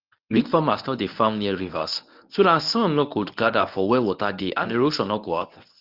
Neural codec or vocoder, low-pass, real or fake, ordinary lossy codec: codec, 24 kHz, 0.9 kbps, WavTokenizer, medium speech release version 1; 5.4 kHz; fake; Opus, 24 kbps